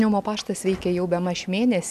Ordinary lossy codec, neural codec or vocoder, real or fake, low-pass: MP3, 96 kbps; none; real; 14.4 kHz